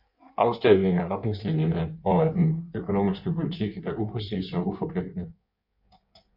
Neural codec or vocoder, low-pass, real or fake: codec, 16 kHz in and 24 kHz out, 1.1 kbps, FireRedTTS-2 codec; 5.4 kHz; fake